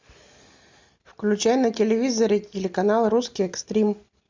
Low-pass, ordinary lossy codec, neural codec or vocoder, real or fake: 7.2 kHz; MP3, 64 kbps; none; real